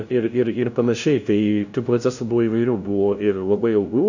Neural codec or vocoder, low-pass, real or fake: codec, 16 kHz, 0.5 kbps, FunCodec, trained on LibriTTS, 25 frames a second; 7.2 kHz; fake